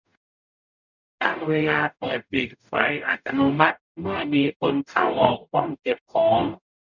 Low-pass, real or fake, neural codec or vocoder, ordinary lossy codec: 7.2 kHz; fake; codec, 44.1 kHz, 0.9 kbps, DAC; none